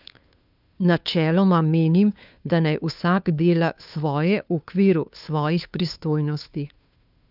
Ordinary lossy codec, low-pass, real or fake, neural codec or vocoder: none; 5.4 kHz; fake; codec, 16 kHz, 2 kbps, FunCodec, trained on Chinese and English, 25 frames a second